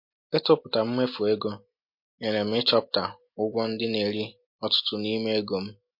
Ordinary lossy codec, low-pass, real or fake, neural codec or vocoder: MP3, 32 kbps; 5.4 kHz; real; none